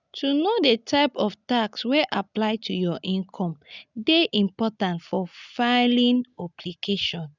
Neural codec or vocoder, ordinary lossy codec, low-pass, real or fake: none; none; 7.2 kHz; real